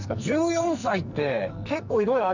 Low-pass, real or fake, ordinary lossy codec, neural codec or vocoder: 7.2 kHz; fake; none; codec, 44.1 kHz, 2.6 kbps, SNAC